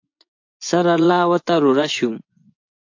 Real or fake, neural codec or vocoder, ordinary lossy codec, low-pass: fake; vocoder, 24 kHz, 100 mel bands, Vocos; AAC, 48 kbps; 7.2 kHz